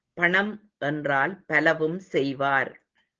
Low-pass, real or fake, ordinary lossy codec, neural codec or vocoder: 7.2 kHz; real; Opus, 16 kbps; none